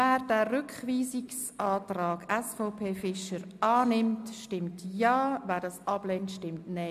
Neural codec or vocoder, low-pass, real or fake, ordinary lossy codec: none; 14.4 kHz; real; MP3, 96 kbps